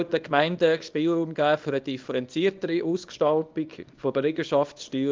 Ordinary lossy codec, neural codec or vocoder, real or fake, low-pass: Opus, 32 kbps; codec, 24 kHz, 0.9 kbps, WavTokenizer, small release; fake; 7.2 kHz